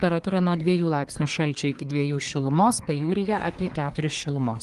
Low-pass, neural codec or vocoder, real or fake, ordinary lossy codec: 10.8 kHz; codec, 24 kHz, 1 kbps, SNAC; fake; Opus, 24 kbps